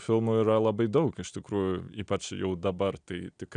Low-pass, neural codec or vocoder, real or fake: 9.9 kHz; none; real